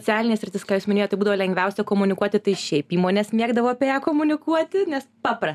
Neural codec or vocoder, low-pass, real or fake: none; 14.4 kHz; real